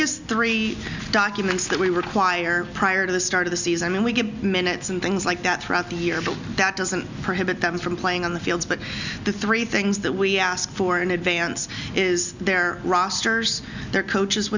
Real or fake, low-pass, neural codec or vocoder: real; 7.2 kHz; none